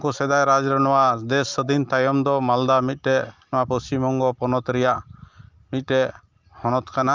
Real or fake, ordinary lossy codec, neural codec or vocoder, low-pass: real; Opus, 24 kbps; none; 7.2 kHz